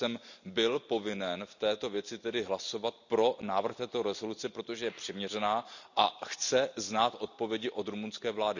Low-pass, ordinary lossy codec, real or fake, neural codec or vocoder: 7.2 kHz; none; real; none